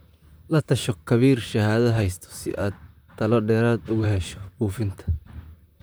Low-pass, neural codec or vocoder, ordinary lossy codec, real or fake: none; vocoder, 44.1 kHz, 128 mel bands, Pupu-Vocoder; none; fake